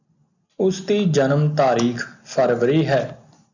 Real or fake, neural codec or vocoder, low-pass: real; none; 7.2 kHz